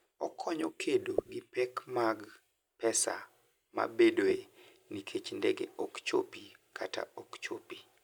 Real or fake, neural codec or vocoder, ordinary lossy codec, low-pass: real; none; none; none